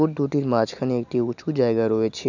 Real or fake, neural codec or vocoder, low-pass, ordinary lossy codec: real; none; 7.2 kHz; none